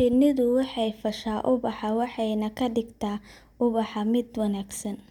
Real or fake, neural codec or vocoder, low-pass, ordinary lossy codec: real; none; 19.8 kHz; none